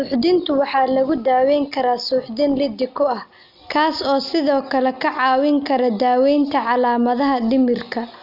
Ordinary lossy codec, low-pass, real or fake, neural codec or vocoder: none; 5.4 kHz; real; none